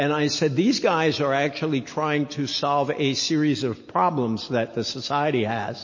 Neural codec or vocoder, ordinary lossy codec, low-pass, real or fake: none; MP3, 32 kbps; 7.2 kHz; real